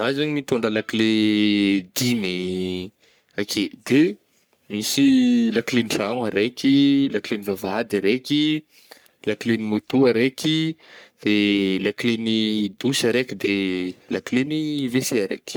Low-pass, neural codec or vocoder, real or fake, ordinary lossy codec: none; codec, 44.1 kHz, 3.4 kbps, Pupu-Codec; fake; none